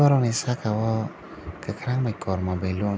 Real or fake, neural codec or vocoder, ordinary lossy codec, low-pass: real; none; none; none